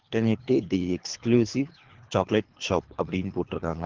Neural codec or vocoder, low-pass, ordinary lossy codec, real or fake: codec, 24 kHz, 3 kbps, HILCodec; 7.2 kHz; Opus, 16 kbps; fake